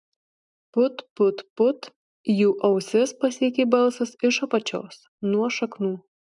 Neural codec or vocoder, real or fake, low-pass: none; real; 10.8 kHz